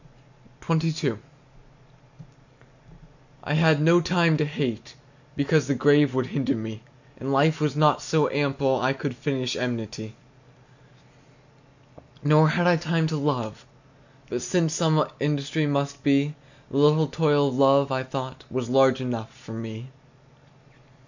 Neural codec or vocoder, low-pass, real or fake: none; 7.2 kHz; real